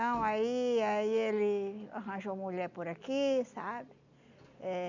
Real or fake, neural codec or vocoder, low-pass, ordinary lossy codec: real; none; 7.2 kHz; none